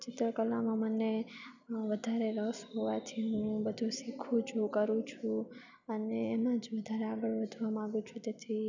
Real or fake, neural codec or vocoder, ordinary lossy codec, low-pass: real; none; none; 7.2 kHz